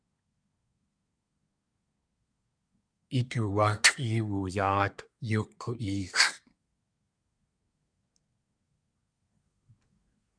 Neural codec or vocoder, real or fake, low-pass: codec, 24 kHz, 1 kbps, SNAC; fake; 9.9 kHz